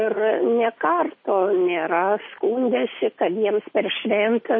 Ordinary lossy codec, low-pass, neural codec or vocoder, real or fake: MP3, 24 kbps; 7.2 kHz; none; real